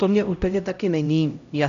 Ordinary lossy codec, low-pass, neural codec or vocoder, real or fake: MP3, 96 kbps; 7.2 kHz; codec, 16 kHz, 0.5 kbps, X-Codec, HuBERT features, trained on LibriSpeech; fake